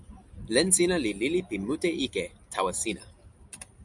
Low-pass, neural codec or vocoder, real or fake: 10.8 kHz; none; real